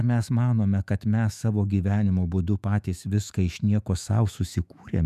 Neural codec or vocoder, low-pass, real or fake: autoencoder, 48 kHz, 128 numbers a frame, DAC-VAE, trained on Japanese speech; 14.4 kHz; fake